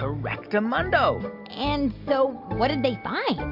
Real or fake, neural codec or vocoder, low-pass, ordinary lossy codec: real; none; 5.4 kHz; MP3, 48 kbps